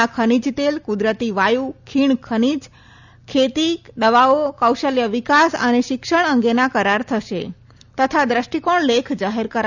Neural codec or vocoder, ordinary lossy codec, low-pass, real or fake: none; none; 7.2 kHz; real